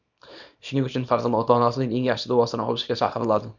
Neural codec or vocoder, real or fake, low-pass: codec, 24 kHz, 0.9 kbps, WavTokenizer, small release; fake; 7.2 kHz